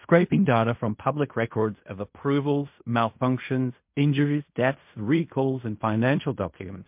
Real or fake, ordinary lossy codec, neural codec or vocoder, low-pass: fake; MP3, 32 kbps; codec, 16 kHz in and 24 kHz out, 0.4 kbps, LongCat-Audio-Codec, fine tuned four codebook decoder; 3.6 kHz